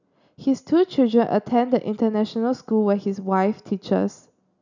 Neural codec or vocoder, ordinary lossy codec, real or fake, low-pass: none; none; real; 7.2 kHz